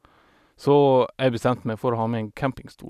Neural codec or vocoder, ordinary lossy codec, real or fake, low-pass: none; none; real; 14.4 kHz